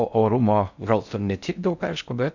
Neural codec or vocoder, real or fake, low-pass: codec, 16 kHz in and 24 kHz out, 0.6 kbps, FocalCodec, streaming, 4096 codes; fake; 7.2 kHz